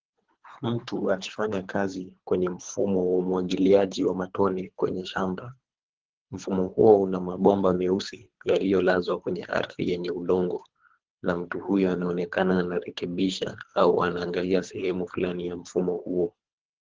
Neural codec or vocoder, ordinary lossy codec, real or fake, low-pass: codec, 24 kHz, 3 kbps, HILCodec; Opus, 16 kbps; fake; 7.2 kHz